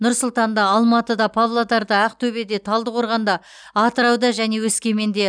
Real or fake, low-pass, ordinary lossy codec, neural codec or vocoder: real; 9.9 kHz; none; none